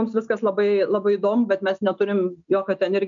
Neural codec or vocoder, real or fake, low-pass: none; real; 7.2 kHz